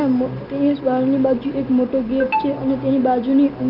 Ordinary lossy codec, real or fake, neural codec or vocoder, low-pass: Opus, 24 kbps; real; none; 5.4 kHz